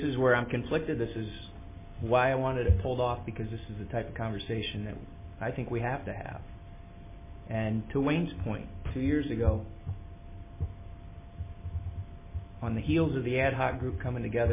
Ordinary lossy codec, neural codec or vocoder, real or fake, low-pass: MP3, 16 kbps; none; real; 3.6 kHz